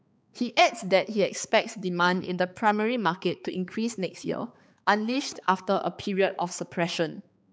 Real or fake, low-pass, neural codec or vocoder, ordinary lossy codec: fake; none; codec, 16 kHz, 4 kbps, X-Codec, HuBERT features, trained on balanced general audio; none